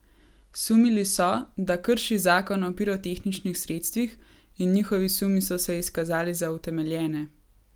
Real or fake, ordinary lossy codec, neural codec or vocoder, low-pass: real; Opus, 32 kbps; none; 19.8 kHz